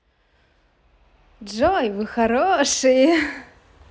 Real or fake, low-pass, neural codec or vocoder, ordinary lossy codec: real; none; none; none